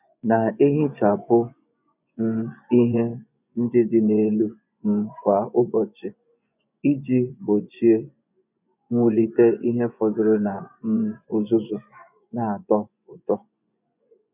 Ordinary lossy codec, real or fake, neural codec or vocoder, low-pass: none; fake; vocoder, 24 kHz, 100 mel bands, Vocos; 3.6 kHz